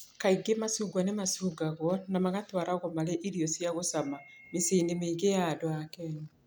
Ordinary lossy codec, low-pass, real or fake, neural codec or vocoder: none; none; real; none